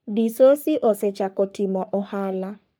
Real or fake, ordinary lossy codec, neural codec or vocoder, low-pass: fake; none; codec, 44.1 kHz, 3.4 kbps, Pupu-Codec; none